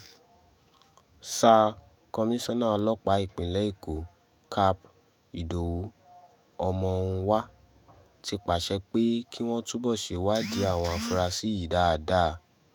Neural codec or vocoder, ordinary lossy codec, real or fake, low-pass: autoencoder, 48 kHz, 128 numbers a frame, DAC-VAE, trained on Japanese speech; none; fake; none